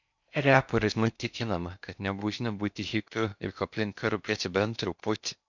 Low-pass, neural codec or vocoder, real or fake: 7.2 kHz; codec, 16 kHz in and 24 kHz out, 0.6 kbps, FocalCodec, streaming, 4096 codes; fake